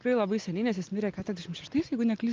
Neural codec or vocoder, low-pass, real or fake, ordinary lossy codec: none; 7.2 kHz; real; Opus, 32 kbps